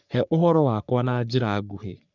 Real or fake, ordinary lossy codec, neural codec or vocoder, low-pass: fake; none; codec, 44.1 kHz, 3.4 kbps, Pupu-Codec; 7.2 kHz